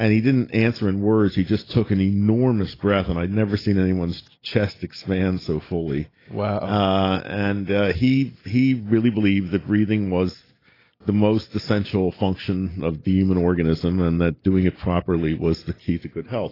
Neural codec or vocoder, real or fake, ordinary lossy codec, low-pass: none; real; AAC, 24 kbps; 5.4 kHz